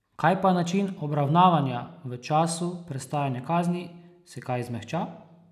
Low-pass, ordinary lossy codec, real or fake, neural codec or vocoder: 14.4 kHz; none; real; none